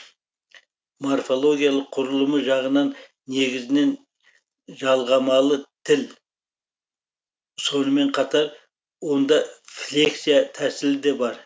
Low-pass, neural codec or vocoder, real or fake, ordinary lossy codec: none; none; real; none